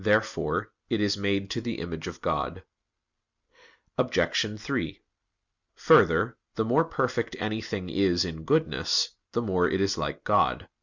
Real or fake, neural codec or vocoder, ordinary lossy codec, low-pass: real; none; Opus, 64 kbps; 7.2 kHz